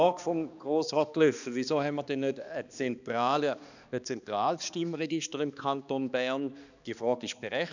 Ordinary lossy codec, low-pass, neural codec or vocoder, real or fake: none; 7.2 kHz; codec, 16 kHz, 2 kbps, X-Codec, HuBERT features, trained on balanced general audio; fake